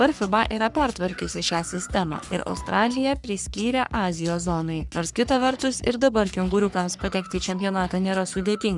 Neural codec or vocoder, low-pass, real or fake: codec, 44.1 kHz, 3.4 kbps, Pupu-Codec; 10.8 kHz; fake